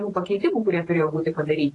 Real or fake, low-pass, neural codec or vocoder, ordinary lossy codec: fake; 10.8 kHz; vocoder, 44.1 kHz, 128 mel bands every 512 samples, BigVGAN v2; AAC, 32 kbps